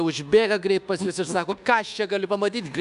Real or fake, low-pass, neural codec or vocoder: fake; 10.8 kHz; codec, 24 kHz, 1.2 kbps, DualCodec